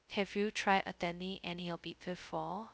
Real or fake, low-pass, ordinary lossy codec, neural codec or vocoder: fake; none; none; codec, 16 kHz, 0.2 kbps, FocalCodec